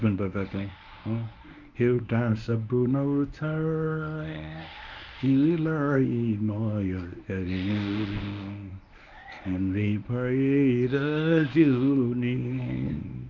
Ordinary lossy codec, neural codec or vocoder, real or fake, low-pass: none; codec, 24 kHz, 0.9 kbps, WavTokenizer, medium speech release version 1; fake; 7.2 kHz